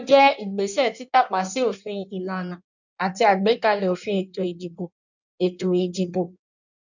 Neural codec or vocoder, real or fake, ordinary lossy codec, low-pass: codec, 16 kHz in and 24 kHz out, 1.1 kbps, FireRedTTS-2 codec; fake; none; 7.2 kHz